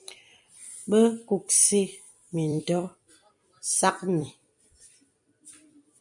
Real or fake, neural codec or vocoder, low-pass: fake; vocoder, 44.1 kHz, 128 mel bands every 256 samples, BigVGAN v2; 10.8 kHz